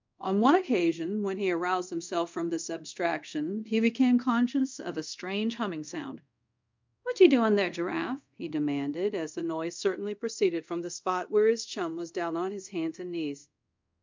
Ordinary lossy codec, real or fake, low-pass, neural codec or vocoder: MP3, 64 kbps; fake; 7.2 kHz; codec, 24 kHz, 0.5 kbps, DualCodec